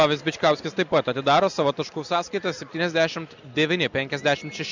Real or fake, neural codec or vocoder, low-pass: real; none; 7.2 kHz